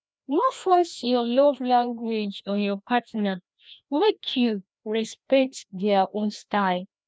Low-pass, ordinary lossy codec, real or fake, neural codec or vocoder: none; none; fake; codec, 16 kHz, 1 kbps, FreqCodec, larger model